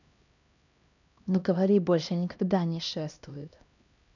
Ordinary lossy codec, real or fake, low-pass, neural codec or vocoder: none; fake; 7.2 kHz; codec, 16 kHz, 1 kbps, X-Codec, HuBERT features, trained on LibriSpeech